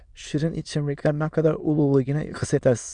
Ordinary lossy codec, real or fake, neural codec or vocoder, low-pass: Opus, 64 kbps; fake; autoencoder, 22.05 kHz, a latent of 192 numbers a frame, VITS, trained on many speakers; 9.9 kHz